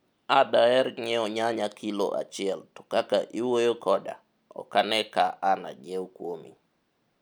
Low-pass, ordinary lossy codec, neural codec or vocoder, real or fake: none; none; none; real